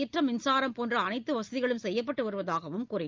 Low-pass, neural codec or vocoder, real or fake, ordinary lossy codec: 7.2 kHz; none; real; Opus, 24 kbps